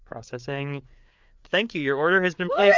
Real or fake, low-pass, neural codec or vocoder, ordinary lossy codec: fake; 7.2 kHz; codec, 16 kHz, 4 kbps, FreqCodec, larger model; MP3, 64 kbps